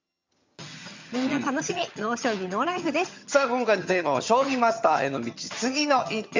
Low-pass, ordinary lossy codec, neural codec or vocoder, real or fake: 7.2 kHz; MP3, 64 kbps; vocoder, 22.05 kHz, 80 mel bands, HiFi-GAN; fake